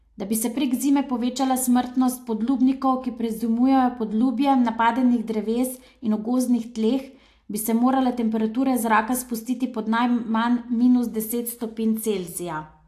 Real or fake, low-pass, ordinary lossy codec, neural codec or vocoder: real; 14.4 kHz; AAC, 64 kbps; none